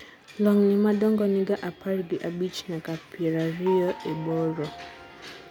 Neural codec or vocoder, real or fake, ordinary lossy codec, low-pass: none; real; none; 19.8 kHz